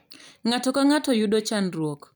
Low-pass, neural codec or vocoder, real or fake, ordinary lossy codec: none; vocoder, 44.1 kHz, 128 mel bands every 256 samples, BigVGAN v2; fake; none